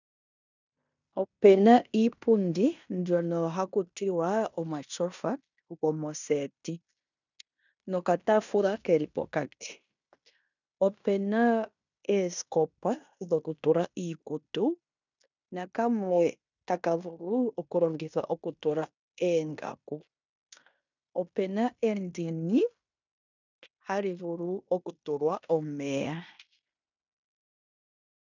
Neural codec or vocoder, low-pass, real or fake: codec, 16 kHz in and 24 kHz out, 0.9 kbps, LongCat-Audio-Codec, fine tuned four codebook decoder; 7.2 kHz; fake